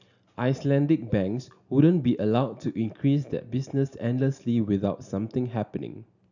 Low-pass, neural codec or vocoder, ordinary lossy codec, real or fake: 7.2 kHz; none; none; real